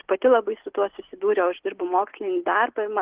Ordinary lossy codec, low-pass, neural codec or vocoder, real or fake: Opus, 16 kbps; 3.6 kHz; none; real